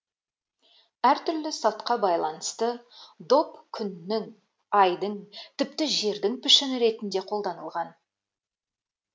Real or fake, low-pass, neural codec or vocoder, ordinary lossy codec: real; none; none; none